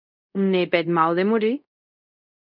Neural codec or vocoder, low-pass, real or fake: codec, 24 kHz, 0.5 kbps, DualCodec; 5.4 kHz; fake